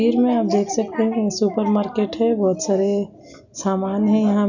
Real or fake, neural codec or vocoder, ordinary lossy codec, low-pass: real; none; none; 7.2 kHz